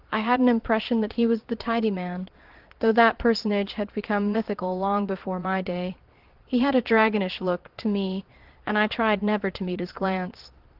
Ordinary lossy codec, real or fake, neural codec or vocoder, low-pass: Opus, 16 kbps; fake; vocoder, 22.05 kHz, 80 mel bands, Vocos; 5.4 kHz